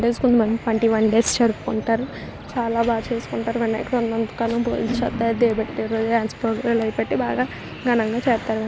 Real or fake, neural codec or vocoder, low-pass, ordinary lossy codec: real; none; none; none